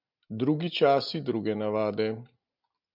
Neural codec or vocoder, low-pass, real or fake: none; 5.4 kHz; real